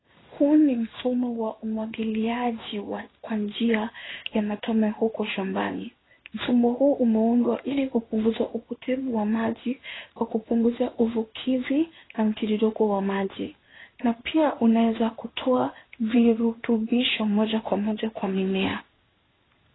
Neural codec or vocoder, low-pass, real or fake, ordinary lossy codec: codec, 16 kHz in and 24 kHz out, 1 kbps, XY-Tokenizer; 7.2 kHz; fake; AAC, 16 kbps